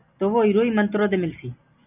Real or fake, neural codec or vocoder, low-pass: real; none; 3.6 kHz